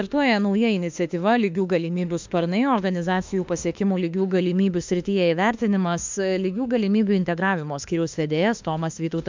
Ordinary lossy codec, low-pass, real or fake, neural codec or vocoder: MP3, 64 kbps; 7.2 kHz; fake; autoencoder, 48 kHz, 32 numbers a frame, DAC-VAE, trained on Japanese speech